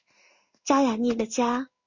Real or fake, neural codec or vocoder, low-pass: fake; codec, 16 kHz in and 24 kHz out, 1 kbps, XY-Tokenizer; 7.2 kHz